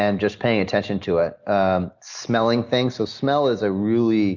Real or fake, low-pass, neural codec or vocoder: real; 7.2 kHz; none